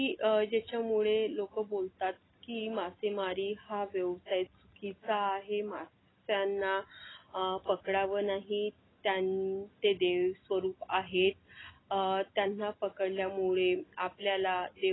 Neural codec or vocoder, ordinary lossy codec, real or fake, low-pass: none; AAC, 16 kbps; real; 7.2 kHz